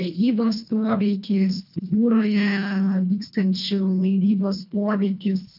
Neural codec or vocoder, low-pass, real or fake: codec, 24 kHz, 1.5 kbps, HILCodec; 5.4 kHz; fake